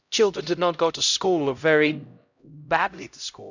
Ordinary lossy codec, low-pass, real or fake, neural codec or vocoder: none; 7.2 kHz; fake; codec, 16 kHz, 0.5 kbps, X-Codec, HuBERT features, trained on LibriSpeech